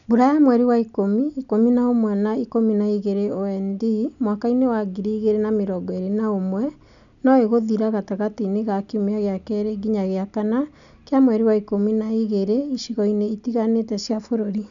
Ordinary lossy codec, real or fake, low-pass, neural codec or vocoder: none; real; 7.2 kHz; none